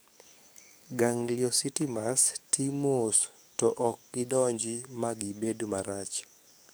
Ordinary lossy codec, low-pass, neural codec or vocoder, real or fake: none; none; codec, 44.1 kHz, 7.8 kbps, DAC; fake